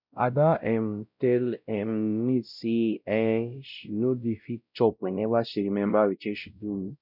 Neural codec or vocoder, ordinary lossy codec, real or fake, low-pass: codec, 16 kHz, 0.5 kbps, X-Codec, WavLM features, trained on Multilingual LibriSpeech; none; fake; 5.4 kHz